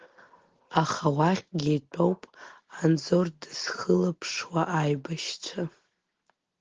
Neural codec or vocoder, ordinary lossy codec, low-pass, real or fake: none; Opus, 16 kbps; 7.2 kHz; real